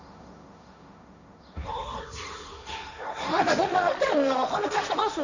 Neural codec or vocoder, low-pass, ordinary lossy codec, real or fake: codec, 16 kHz, 1.1 kbps, Voila-Tokenizer; 7.2 kHz; none; fake